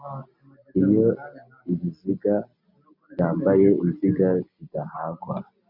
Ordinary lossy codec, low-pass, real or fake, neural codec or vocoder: MP3, 48 kbps; 5.4 kHz; real; none